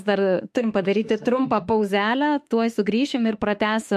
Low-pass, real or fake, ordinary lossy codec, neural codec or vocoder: 14.4 kHz; fake; MP3, 64 kbps; autoencoder, 48 kHz, 32 numbers a frame, DAC-VAE, trained on Japanese speech